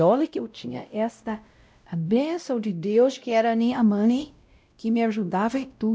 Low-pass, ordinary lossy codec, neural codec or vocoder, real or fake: none; none; codec, 16 kHz, 0.5 kbps, X-Codec, WavLM features, trained on Multilingual LibriSpeech; fake